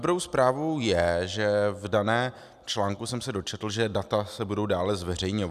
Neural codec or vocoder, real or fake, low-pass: none; real; 14.4 kHz